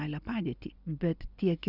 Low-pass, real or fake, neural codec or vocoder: 5.4 kHz; real; none